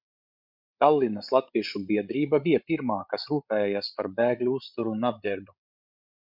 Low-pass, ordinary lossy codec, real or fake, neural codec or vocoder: 5.4 kHz; Opus, 64 kbps; fake; codec, 16 kHz, 8 kbps, FreqCodec, larger model